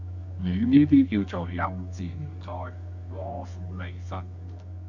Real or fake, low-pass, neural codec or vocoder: fake; 7.2 kHz; codec, 24 kHz, 0.9 kbps, WavTokenizer, medium music audio release